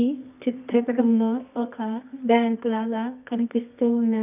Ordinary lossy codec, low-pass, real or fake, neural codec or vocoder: none; 3.6 kHz; fake; codec, 24 kHz, 0.9 kbps, WavTokenizer, medium music audio release